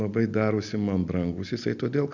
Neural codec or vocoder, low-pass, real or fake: none; 7.2 kHz; real